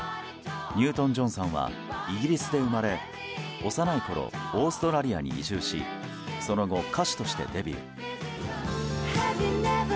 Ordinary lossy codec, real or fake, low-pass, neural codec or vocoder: none; real; none; none